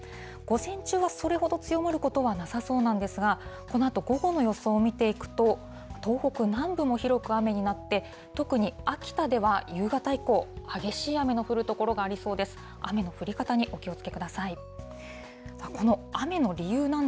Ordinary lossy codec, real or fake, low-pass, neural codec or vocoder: none; real; none; none